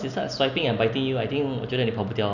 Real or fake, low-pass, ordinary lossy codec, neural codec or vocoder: real; 7.2 kHz; none; none